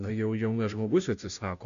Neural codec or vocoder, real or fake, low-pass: codec, 16 kHz, 0.5 kbps, FunCodec, trained on Chinese and English, 25 frames a second; fake; 7.2 kHz